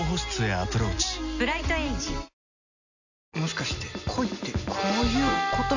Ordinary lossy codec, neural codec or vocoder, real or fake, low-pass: none; none; real; 7.2 kHz